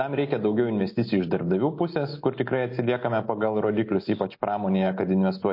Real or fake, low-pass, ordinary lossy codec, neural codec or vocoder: real; 5.4 kHz; MP3, 32 kbps; none